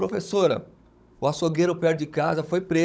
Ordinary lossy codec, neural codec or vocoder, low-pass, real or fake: none; codec, 16 kHz, 16 kbps, FunCodec, trained on Chinese and English, 50 frames a second; none; fake